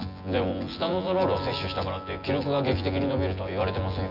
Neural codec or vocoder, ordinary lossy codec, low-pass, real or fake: vocoder, 24 kHz, 100 mel bands, Vocos; none; 5.4 kHz; fake